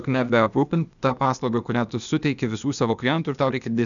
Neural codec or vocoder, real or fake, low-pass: codec, 16 kHz, 0.8 kbps, ZipCodec; fake; 7.2 kHz